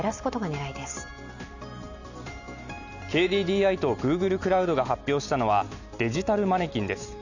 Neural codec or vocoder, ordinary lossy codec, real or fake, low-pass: none; none; real; 7.2 kHz